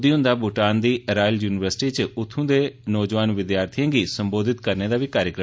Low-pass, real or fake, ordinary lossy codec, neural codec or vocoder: none; real; none; none